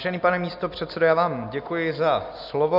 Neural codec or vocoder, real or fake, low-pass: none; real; 5.4 kHz